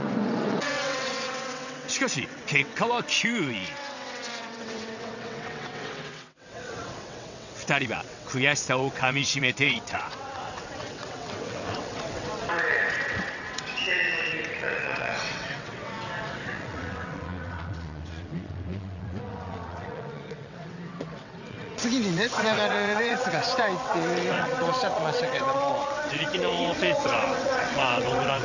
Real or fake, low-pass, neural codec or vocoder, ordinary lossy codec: fake; 7.2 kHz; vocoder, 22.05 kHz, 80 mel bands, WaveNeXt; none